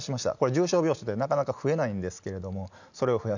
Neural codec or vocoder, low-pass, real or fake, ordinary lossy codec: none; 7.2 kHz; real; none